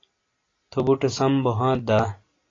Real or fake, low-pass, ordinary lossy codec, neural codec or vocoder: real; 7.2 kHz; AAC, 32 kbps; none